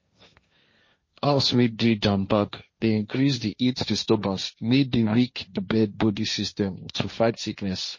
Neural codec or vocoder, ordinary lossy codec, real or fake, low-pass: codec, 16 kHz, 1.1 kbps, Voila-Tokenizer; MP3, 32 kbps; fake; 7.2 kHz